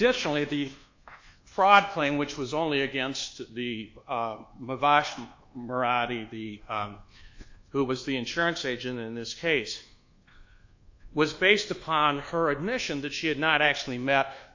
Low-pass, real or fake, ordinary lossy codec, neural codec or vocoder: 7.2 kHz; fake; AAC, 48 kbps; codec, 24 kHz, 1.2 kbps, DualCodec